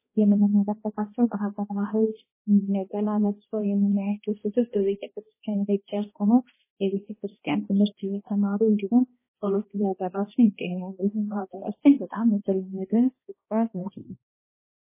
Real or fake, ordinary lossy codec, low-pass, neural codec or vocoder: fake; MP3, 16 kbps; 3.6 kHz; codec, 16 kHz, 1 kbps, X-Codec, HuBERT features, trained on balanced general audio